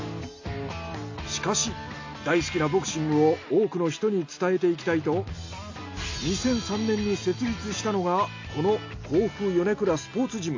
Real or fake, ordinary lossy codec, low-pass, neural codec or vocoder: real; AAC, 48 kbps; 7.2 kHz; none